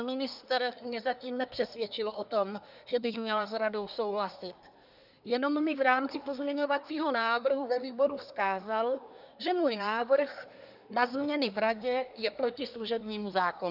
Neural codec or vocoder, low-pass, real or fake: codec, 24 kHz, 1 kbps, SNAC; 5.4 kHz; fake